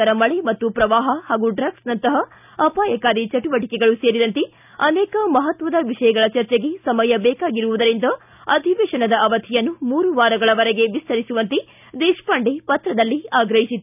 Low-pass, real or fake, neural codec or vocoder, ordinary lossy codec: 3.6 kHz; real; none; none